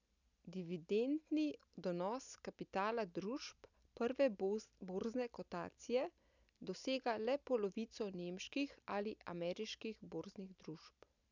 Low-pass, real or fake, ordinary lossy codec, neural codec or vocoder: 7.2 kHz; real; none; none